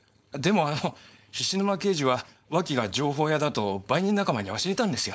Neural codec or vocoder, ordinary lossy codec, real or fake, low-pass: codec, 16 kHz, 4.8 kbps, FACodec; none; fake; none